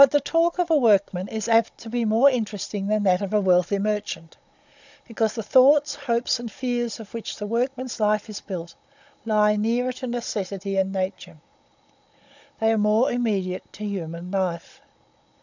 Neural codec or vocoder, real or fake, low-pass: codec, 16 kHz, 16 kbps, FunCodec, trained on Chinese and English, 50 frames a second; fake; 7.2 kHz